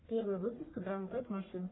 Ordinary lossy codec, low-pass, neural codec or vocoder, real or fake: AAC, 16 kbps; 7.2 kHz; codec, 44.1 kHz, 1.7 kbps, Pupu-Codec; fake